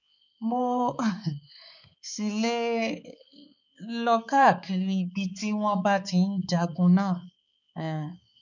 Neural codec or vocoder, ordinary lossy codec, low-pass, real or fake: codec, 16 kHz, 4 kbps, X-Codec, HuBERT features, trained on balanced general audio; none; 7.2 kHz; fake